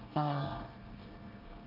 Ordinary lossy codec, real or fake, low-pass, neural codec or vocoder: Opus, 24 kbps; fake; 5.4 kHz; codec, 24 kHz, 1 kbps, SNAC